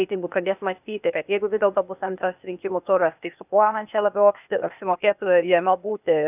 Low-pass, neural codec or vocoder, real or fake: 3.6 kHz; codec, 16 kHz, 0.8 kbps, ZipCodec; fake